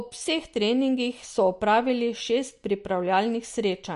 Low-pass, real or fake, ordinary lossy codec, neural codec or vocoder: 14.4 kHz; real; MP3, 48 kbps; none